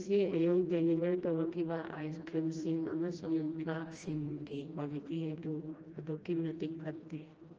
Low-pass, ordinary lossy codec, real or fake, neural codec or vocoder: 7.2 kHz; Opus, 24 kbps; fake; codec, 16 kHz, 1 kbps, FreqCodec, smaller model